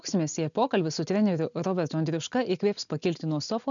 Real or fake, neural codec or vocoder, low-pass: real; none; 7.2 kHz